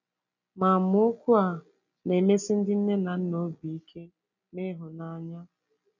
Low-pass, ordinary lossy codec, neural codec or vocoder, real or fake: 7.2 kHz; none; none; real